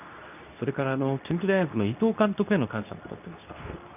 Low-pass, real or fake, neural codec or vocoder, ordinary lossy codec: 3.6 kHz; fake; codec, 24 kHz, 0.9 kbps, WavTokenizer, medium speech release version 2; none